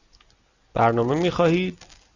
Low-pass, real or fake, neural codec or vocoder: 7.2 kHz; real; none